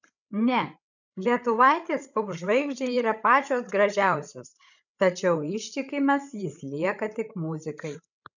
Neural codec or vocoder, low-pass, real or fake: codec, 16 kHz, 16 kbps, FreqCodec, larger model; 7.2 kHz; fake